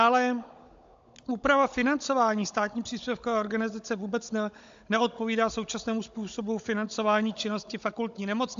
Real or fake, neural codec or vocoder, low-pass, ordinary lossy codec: fake; codec, 16 kHz, 16 kbps, FunCodec, trained on Chinese and English, 50 frames a second; 7.2 kHz; AAC, 64 kbps